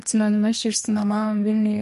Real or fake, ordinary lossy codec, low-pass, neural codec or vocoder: fake; MP3, 48 kbps; 14.4 kHz; codec, 32 kHz, 1.9 kbps, SNAC